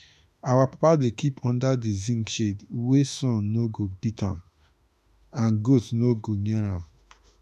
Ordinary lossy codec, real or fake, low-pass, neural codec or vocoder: none; fake; 14.4 kHz; autoencoder, 48 kHz, 32 numbers a frame, DAC-VAE, trained on Japanese speech